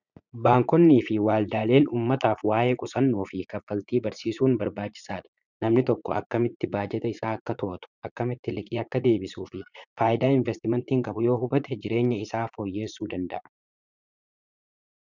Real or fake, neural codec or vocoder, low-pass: fake; vocoder, 44.1 kHz, 80 mel bands, Vocos; 7.2 kHz